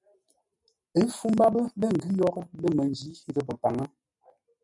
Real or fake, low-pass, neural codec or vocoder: real; 10.8 kHz; none